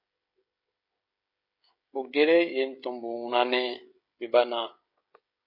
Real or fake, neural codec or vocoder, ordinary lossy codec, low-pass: fake; codec, 16 kHz, 16 kbps, FreqCodec, smaller model; MP3, 32 kbps; 5.4 kHz